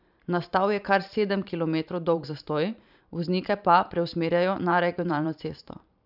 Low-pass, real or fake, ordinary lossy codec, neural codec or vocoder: 5.4 kHz; fake; none; vocoder, 44.1 kHz, 128 mel bands every 512 samples, BigVGAN v2